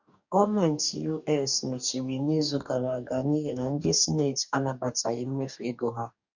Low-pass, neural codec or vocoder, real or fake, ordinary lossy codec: 7.2 kHz; codec, 44.1 kHz, 2.6 kbps, DAC; fake; none